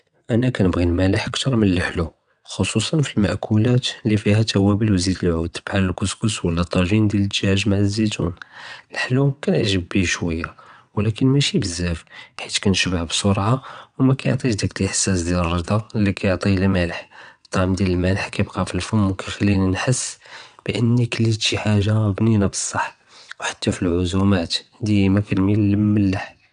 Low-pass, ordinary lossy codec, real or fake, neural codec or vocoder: 9.9 kHz; none; fake; vocoder, 22.05 kHz, 80 mel bands, WaveNeXt